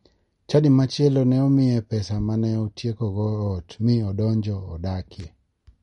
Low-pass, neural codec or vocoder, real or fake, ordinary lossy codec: 19.8 kHz; none; real; MP3, 48 kbps